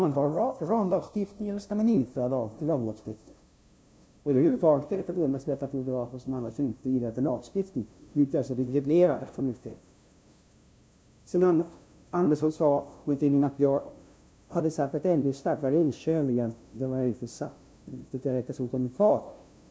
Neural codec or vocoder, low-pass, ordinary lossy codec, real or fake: codec, 16 kHz, 0.5 kbps, FunCodec, trained on LibriTTS, 25 frames a second; none; none; fake